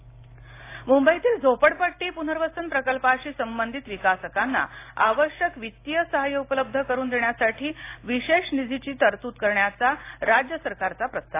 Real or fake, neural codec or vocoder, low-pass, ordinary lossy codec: real; none; 3.6 kHz; AAC, 24 kbps